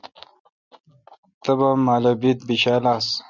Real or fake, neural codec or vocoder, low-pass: real; none; 7.2 kHz